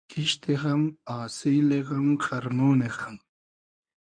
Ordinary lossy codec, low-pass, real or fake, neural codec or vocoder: MP3, 96 kbps; 9.9 kHz; fake; codec, 24 kHz, 0.9 kbps, WavTokenizer, medium speech release version 1